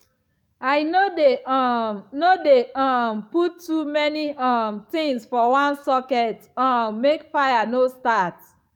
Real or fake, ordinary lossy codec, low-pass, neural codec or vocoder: fake; none; 19.8 kHz; codec, 44.1 kHz, 7.8 kbps, DAC